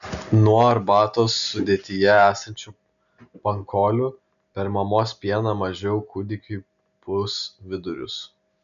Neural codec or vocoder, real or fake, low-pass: none; real; 7.2 kHz